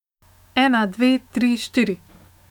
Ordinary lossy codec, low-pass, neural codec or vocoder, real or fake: none; 19.8 kHz; autoencoder, 48 kHz, 128 numbers a frame, DAC-VAE, trained on Japanese speech; fake